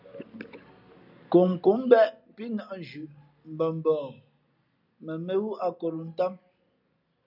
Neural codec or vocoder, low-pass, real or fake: none; 5.4 kHz; real